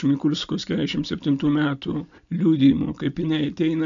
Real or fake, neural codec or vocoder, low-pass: real; none; 7.2 kHz